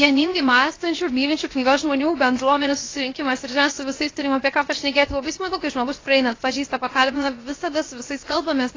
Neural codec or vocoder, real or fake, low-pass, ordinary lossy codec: codec, 16 kHz, 0.7 kbps, FocalCodec; fake; 7.2 kHz; AAC, 32 kbps